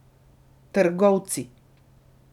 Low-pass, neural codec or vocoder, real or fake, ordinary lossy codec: 19.8 kHz; none; real; none